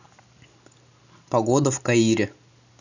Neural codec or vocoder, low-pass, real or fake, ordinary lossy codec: none; 7.2 kHz; real; none